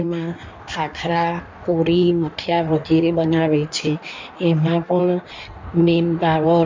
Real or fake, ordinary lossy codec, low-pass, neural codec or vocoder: fake; MP3, 64 kbps; 7.2 kHz; codec, 16 kHz in and 24 kHz out, 1.1 kbps, FireRedTTS-2 codec